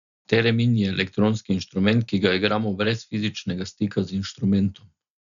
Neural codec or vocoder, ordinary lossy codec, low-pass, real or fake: none; none; 7.2 kHz; real